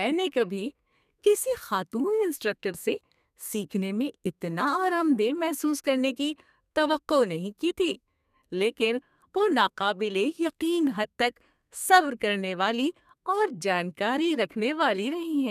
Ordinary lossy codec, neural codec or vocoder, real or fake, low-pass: none; codec, 32 kHz, 1.9 kbps, SNAC; fake; 14.4 kHz